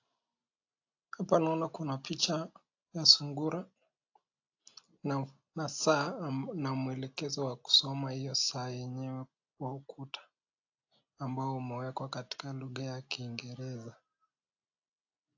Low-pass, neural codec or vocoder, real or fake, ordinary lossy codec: 7.2 kHz; none; real; AAC, 48 kbps